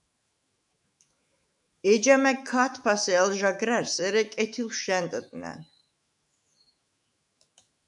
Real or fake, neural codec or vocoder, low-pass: fake; autoencoder, 48 kHz, 128 numbers a frame, DAC-VAE, trained on Japanese speech; 10.8 kHz